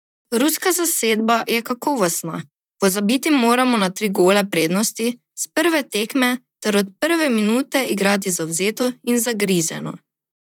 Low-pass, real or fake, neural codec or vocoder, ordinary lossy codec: 19.8 kHz; fake; vocoder, 44.1 kHz, 128 mel bands, Pupu-Vocoder; none